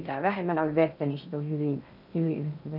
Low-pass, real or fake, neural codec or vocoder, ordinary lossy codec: 5.4 kHz; fake; codec, 16 kHz in and 24 kHz out, 0.6 kbps, FocalCodec, streaming, 2048 codes; none